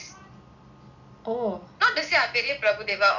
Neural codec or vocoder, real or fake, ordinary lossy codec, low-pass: none; real; none; 7.2 kHz